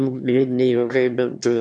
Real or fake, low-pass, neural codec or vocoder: fake; 9.9 kHz; autoencoder, 22.05 kHz, a latent of 192 numbers a frame, VITS, trained on one speaker